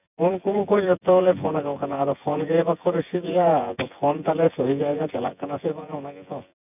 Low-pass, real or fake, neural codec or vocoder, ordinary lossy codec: 3.6 kHz; fake; vocoder, 24 kHz, 100 mel bands, Vocos; none